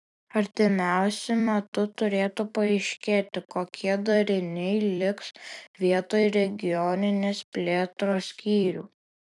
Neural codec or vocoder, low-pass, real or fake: vocoder, 44.1 kHz, 128 mel bands every 256 samples, BigVGAN v2; 10.8 kHz; fake